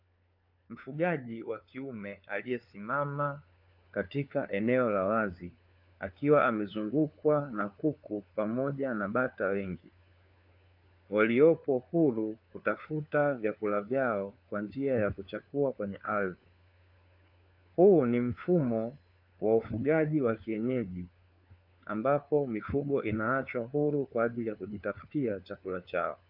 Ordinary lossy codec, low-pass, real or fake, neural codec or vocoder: AAC, 48 kbps; 5.4 kHz; fake; codec, 16 kHz, 4 kbps, FunCodec, trained on LibriTTS, 50 frames a second